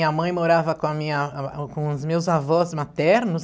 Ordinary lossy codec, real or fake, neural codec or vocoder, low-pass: none; real; none; none